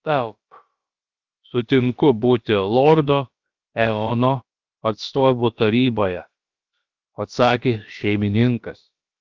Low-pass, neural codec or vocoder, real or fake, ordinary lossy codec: 7.2 kHz; codec, 16 kHz, about 1 kbps, DyCAST, with the encoder's durations; fake; Opus, 32 kbps